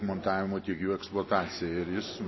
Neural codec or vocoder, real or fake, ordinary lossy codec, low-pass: none; real; MP3, 24 kbps; 7.2 kHz